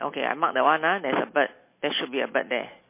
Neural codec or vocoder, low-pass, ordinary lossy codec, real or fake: none; 3.6 kHz; MP3, 24 kbps; real